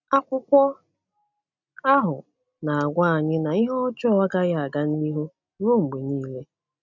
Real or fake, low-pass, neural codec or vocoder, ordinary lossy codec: real; 7.2 kHz; none; none